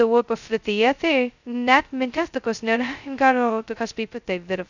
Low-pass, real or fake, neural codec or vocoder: 7.2 kHz; fake; codec, 16 kHz, 0.2 kbps, FocalCodec